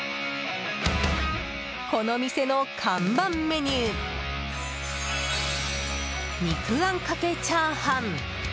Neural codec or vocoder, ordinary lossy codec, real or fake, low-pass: none; none; real; none